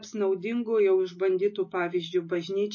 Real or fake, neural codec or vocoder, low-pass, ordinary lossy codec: real; none; 7.2 kHz; MP3, 32 kbps